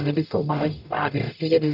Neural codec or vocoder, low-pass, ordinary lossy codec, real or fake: codec, 44.1 kHz, 0.9 kbps, DAC; 5.4 kHz; none; fake